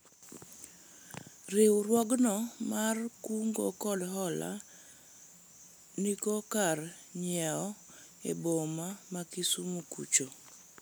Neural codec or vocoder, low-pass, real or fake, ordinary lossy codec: none; none; real; none